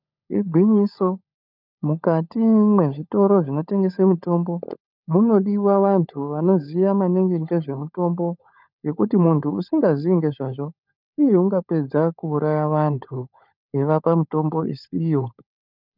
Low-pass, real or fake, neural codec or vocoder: 5.4 kHz; fake; codec, 16 kHz, 16 kbps, FunCodec, trained on LibriTTS, 50 frames a second